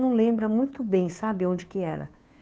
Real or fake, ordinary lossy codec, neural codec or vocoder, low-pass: fake; none; codec, 16 kHz, 2 kbps, FunCodec, trained on Chinese and English, 25 frames a second; none